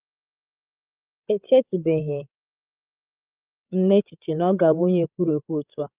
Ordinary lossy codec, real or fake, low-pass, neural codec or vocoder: Opus, 32 kbps; fake; 3.6 kHz; codec, 16 kHz, 16 kbps, FreqCodec, larger model